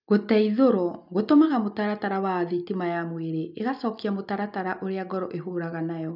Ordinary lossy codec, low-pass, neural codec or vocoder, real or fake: none; 5.4 kHz; none; real